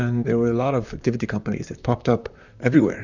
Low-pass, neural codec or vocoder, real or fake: 7.2 kHz; vocoder, 44.1 kHz, 128 mel bands, Pupu-Vocoder; fake